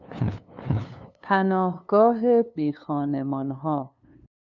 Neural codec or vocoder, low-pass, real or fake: codec, 16 kHz, 2 kbps, FunCodec, trained on LibriTTS, 25 frames a second; 7.2 kHz; fake